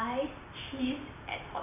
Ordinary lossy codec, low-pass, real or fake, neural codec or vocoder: none; 3.6 kHz; fake; vocoder, 44.1 kHz, 128 mel bands every 512 samples, BigVGAN v2